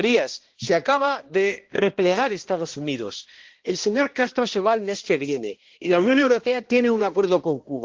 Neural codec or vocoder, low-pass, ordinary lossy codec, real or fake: codec, 16 kHz, 1 kbps, X-Codec, HuBERT features, trained on balanced general audio; 7.2 kHz; Opus, 16 kbps; fake